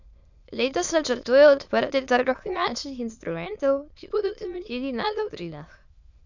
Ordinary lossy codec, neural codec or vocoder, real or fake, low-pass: none; autoencoder, 22.05 kHz, a latent of 192 numbers a frame, VITS, trained on many speakers; fake; 7.2 kHz